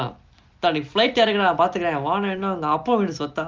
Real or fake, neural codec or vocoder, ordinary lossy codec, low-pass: real; none; Opus, 32 kbps; 7.2 kHz